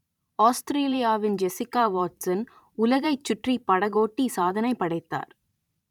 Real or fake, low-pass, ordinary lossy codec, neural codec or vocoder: fake; 19.8 kHz; none; vocoder, 44.1 kHz, 128 mel bands every 512 samples, BigVGAN v2